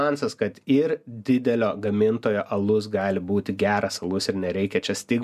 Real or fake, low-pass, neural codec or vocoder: real; 14.4 kHz; none